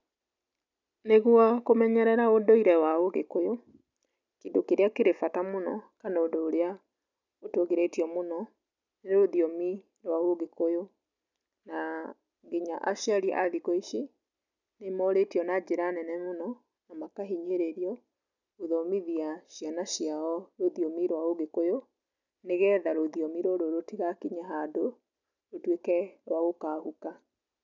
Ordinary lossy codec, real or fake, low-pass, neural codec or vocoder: none; real; 7.2 kHz; none